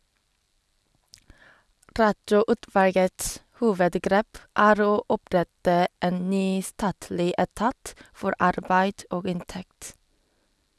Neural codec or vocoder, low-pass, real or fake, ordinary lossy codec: none; none; real; none